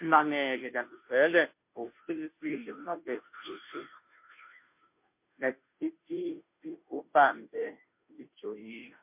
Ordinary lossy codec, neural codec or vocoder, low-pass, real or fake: MP3, 32 kbps; codec, 16 kHz, 0.5 kbps, FunCodec, trained on Chinese and English, 25 frames a second; 3.6 kHz; fake